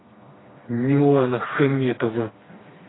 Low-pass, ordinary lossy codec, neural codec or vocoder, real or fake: 7.2 kHz; AAC, 16 kbps; codec, 16 kHz, 2 kbps, FreqCodec, smaller model; fake